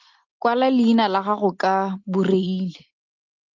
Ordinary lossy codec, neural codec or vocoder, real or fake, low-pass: Opus, 24 kbps; none; real; 7.2 kHz